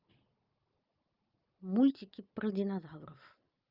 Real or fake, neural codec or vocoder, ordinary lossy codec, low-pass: real; none; Opus, 24 kbps; 5.4 kHz